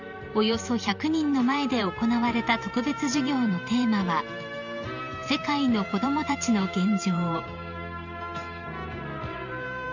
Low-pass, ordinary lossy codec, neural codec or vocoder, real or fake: 7.2 kHz; none; vocoder, 44.1 kHz, 128 mel bands every 512 samples, BigVGAN v2; fake